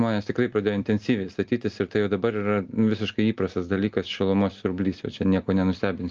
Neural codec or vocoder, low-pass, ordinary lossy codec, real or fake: none; 7.2 kHz; Opus, 24 kbps; real